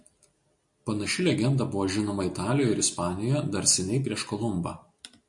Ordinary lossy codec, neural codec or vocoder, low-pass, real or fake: MP3, 48 kbps; none; 10.8 kHz; real